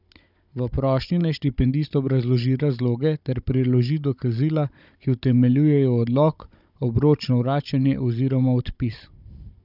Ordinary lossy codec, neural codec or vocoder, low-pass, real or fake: none; codec, 16 kHz, 16 kbps, FunCodec, trained on Chinese and English, 50 frames a second; 5.4 kHz; fake